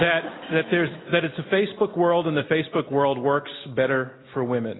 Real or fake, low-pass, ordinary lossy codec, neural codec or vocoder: real; 7.2 kHz; AAC, 16 kbps; none